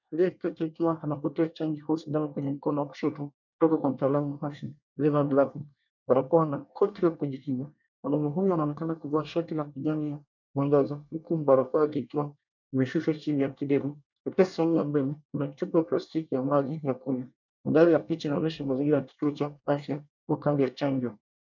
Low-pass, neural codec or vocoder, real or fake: 7.2 kHz; codec, 24 kHz, 1 kbps, SNAC; fake